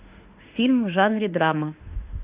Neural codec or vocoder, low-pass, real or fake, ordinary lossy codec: autoencoder, 48 kHz, 32 numbers a frame, DAC-VAE, trained on Japanese speech; 3.6 kHz; fake; Opus, 64 kbps